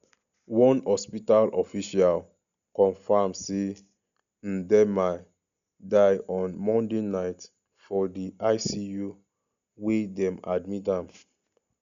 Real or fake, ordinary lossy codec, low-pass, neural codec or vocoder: real; none; 7.2 kHz; none